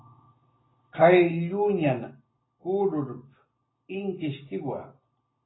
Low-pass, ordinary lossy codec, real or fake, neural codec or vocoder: 7.2 kHz; AAC, 16 kbps; real; none